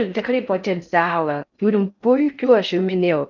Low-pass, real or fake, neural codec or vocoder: 7.2 kHz; fake; codec, 16 kHz in and 24 kHz out, 0.6 kbps, FocalCodec, streaming, 4096 codes